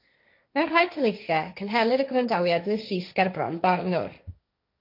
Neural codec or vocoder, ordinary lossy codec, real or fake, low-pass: codec, 16 kHz, 1.1 kbps, Voila-Tokenizer; MP3, 32 kbps; fake; 5.4 kHz